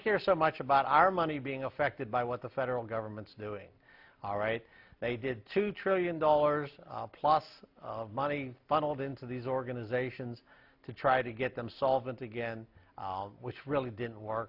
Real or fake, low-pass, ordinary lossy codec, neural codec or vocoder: real; 5.4 kHz; AAC, 48 kbps; none